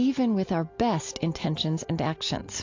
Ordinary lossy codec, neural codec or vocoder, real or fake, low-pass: AAC, 48 kbps; none; real; 7.2 kHz